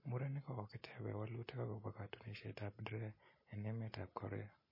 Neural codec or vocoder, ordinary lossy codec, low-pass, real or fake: none; MP3, 24 kbps; 5.4 kHz; real